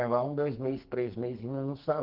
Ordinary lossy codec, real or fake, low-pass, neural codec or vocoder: Opus, 16 kbps; fake; 5.4 kHz; codec, 44.1 kHz, 2.6 kbps, SNAC